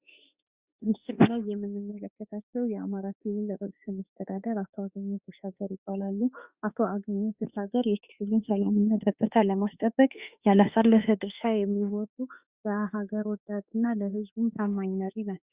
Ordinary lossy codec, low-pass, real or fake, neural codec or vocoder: Opus, 64 kbps; 3.6 kHz; fake; autoencoder, 48 kHz, 32 numbers a frame, DAC-VAE, trained on Japanese speech